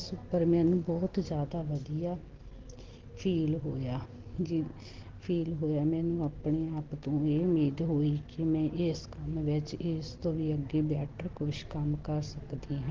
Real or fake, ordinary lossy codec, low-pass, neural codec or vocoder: real; Opus, 16 kbps; 7.2 kHz; none